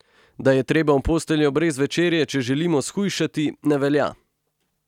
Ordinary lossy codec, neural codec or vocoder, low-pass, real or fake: none; none; 19.8 kHz; real